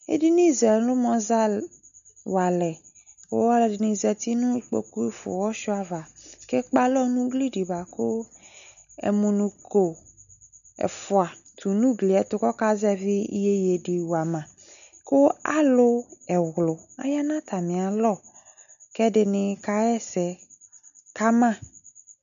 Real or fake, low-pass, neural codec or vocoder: real; 7.2 kHz; none